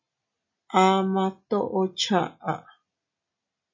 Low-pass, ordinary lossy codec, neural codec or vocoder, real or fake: 7.2 kHz; MP3, 32 kbps; none; real